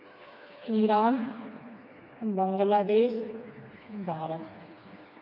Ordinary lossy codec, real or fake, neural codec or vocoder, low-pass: none; fake; codec, 16 kHz, 2 kbps, FreqCodec, smaller model; 5.4 kHz